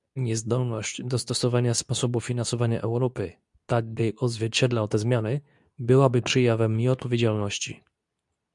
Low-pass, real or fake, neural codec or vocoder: 10.8 kHz; fake; codec, 24 kHz, 0.9 kbps, WavTokenizer, medium speech release version 2